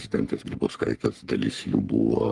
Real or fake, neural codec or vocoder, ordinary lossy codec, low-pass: fake; codec, 44.1 kHz, 3.4 kbps, Pupu-Codec; Opus, 32 kbps; 10.8 kHz